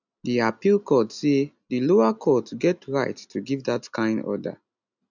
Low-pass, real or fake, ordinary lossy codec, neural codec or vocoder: 7.2 kHz; real; none; none